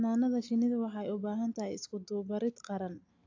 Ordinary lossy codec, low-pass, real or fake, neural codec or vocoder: AAC, 48 kbps; 7.2 kHz; real; none